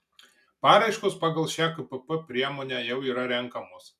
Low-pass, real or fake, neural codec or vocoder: 14.4 kHz; real; none